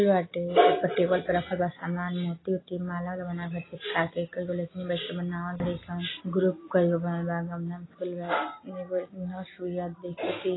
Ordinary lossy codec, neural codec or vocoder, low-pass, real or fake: AAC, 16 kbps; none; 7.2 kHz; real